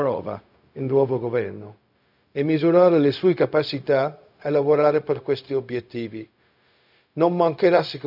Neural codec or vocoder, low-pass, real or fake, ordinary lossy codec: codec, 16 kHz, 0.4 kbps, LongCat-Audio-Codec; 5.4 kHz; fake; none